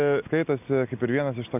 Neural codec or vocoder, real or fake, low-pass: none; real; 3.6 kHz